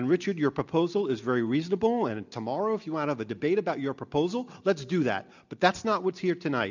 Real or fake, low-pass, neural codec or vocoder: real; 7.2 kHz; none